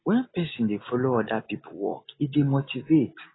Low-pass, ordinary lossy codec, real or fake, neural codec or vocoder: 7.2 kHz; AAC, 16 kbps; real; none